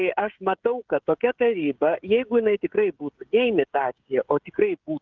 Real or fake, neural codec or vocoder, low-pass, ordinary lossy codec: fake; codec, 16 kHz, 16 kbps, FreqCodec, smaller model; 7.2 kHz; Opus, 32 kbps